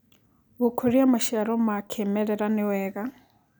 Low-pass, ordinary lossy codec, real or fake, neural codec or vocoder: none; none; real; none